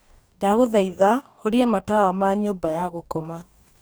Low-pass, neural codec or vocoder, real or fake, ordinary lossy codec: none; codec, 44.1 kHz, 2.6 kbps, DAC; fake; none